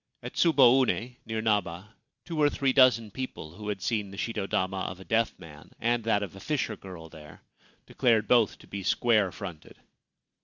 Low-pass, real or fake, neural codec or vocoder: 7.2 kHz; real; none